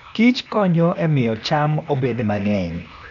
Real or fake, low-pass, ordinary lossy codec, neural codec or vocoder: fake; 7.2 kHz; none; codec, 16 kHz, 0.8 kbps, ZipCodec